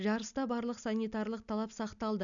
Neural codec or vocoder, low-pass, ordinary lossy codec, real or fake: none; 7.2 kHz; MP3, 96 kbps; real